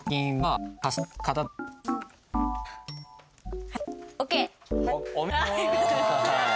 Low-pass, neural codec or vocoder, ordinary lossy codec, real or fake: none; none; none; real